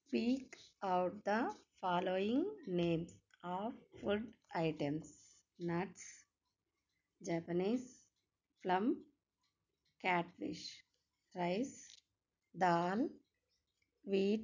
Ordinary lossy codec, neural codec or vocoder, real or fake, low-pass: none; none; real; 7.2 kHz